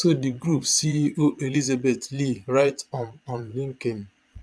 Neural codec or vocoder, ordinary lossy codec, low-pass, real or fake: vocoder, 22.05 kHz, 80 mel bands, Vocos; none; none; fake